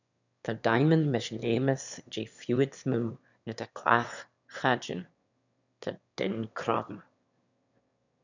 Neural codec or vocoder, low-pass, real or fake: autoencoder, 22.05 kHz, a latent of 192 numbers a frame, VITS, trained on one speaker; 7.2 kHz; fake